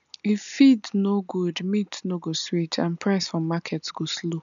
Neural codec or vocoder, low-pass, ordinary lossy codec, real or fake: none; 7.2 kHz; none; real